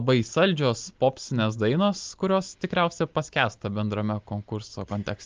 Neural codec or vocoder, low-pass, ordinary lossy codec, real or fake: none; 7.2 kHz; Opus, 24 kbps; real